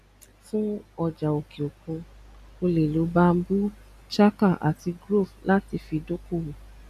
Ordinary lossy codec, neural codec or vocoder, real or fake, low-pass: none; none; real; 14.4 kHz